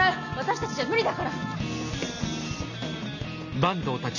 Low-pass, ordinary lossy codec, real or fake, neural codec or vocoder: 7.2 kHz; none; real; none